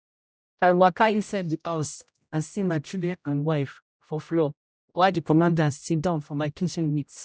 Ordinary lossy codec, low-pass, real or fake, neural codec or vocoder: none; none; fake; codec, 16 kHz, 0.5 kbps, X-Codec, HuBERT features, trained on general audio